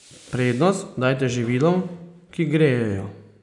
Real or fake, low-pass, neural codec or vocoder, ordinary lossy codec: real; 10.8 kHz; none; none